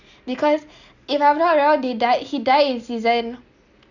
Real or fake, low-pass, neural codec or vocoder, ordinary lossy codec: real; 7.2 kHz; none; none